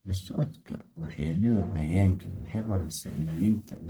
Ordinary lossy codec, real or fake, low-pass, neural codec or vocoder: none; fake; none; codec, 44.1 kHz, 1.7 kbps, Pupu-Codec